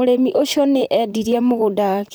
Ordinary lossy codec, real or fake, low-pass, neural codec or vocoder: none; fake; none; vocoder, 44.1 kHz, 128 mel bands, Pupu-Vocoder